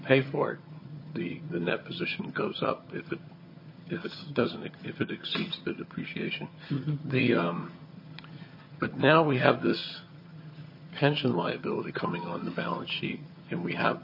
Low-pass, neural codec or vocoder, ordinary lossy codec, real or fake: 5.4 kHz; vocoder, 22.05 kHz, 80 mel bands, HiFi-GAN; MP3, 24 kbps; fake